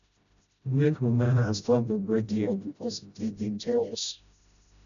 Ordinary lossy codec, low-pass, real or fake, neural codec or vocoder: none; 7.2 kHz; fake; codec, 16 kHz, 0.5 kbps, FreqCodec, smaller model